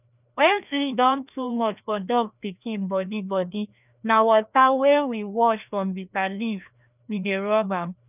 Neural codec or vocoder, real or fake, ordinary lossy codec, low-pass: codec, 16 kHz, 1 kbps, FreqCodec, larger model; fake; none; 3.6 kHz